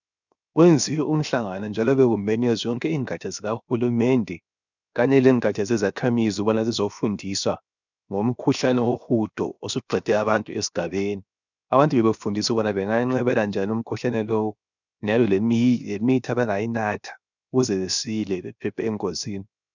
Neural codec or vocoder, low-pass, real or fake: codec, 16 kHz, 0.7 kbps, FocalCodec; 7.2 kHz; fake